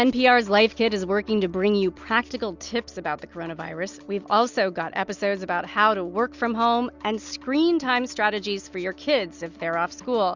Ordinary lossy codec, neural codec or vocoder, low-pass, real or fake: Opus, 64 kbps; none; 7.2 kHz; real